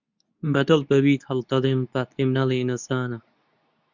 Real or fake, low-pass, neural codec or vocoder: fake; 7.2 kHz; codec, 24 kHz, 0.9 kbps, WavTokenizer, medium speech release version 2